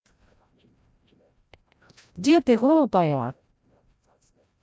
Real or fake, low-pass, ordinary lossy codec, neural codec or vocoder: fake; none; none; codec, 16 kHz, 0.5 kbps, FreqCodec, larger model